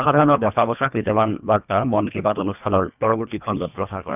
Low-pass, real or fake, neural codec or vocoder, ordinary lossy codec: 3.6 kHz; fake; codec, 24 kHz, 1.5 kbps, HILCodec; none